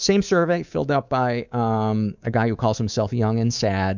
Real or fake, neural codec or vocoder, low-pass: fake; codec, 24 kHz, 3.1 kbps, DualCodec; 7.2 kHz